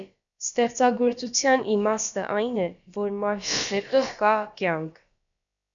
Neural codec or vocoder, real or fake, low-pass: codec, 16 kHz, about 1 kbps, DyCAST, with the encoder's durations; fake; 7.2 kHz